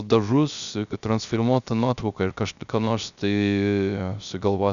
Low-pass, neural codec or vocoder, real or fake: 7.2 kHz; codec, 16 kHz, 0.3 kbps, FocalCodec; fake